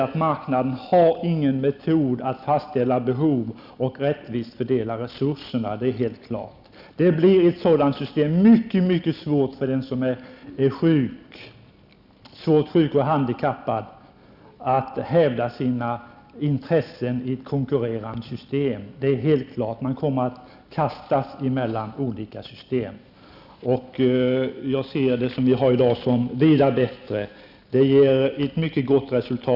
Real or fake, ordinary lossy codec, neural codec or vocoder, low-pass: real; none; none; 5.4 kHz